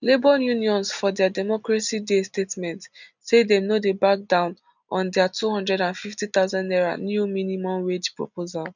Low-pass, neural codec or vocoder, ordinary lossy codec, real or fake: 7.2 kHz; none; none; real